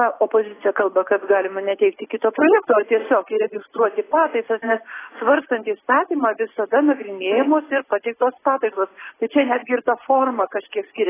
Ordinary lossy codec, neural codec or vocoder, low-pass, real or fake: AAC, 16 kbps; none; 3.6 kHz; real